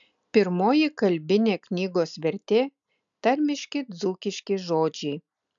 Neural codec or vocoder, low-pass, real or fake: none; 7.2 kHz; real